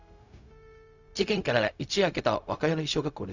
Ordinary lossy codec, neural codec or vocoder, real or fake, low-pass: none; codec, 16 kHz, 0.4 kbps, LongCat-Audio-Codec; fake; 7.2 kHz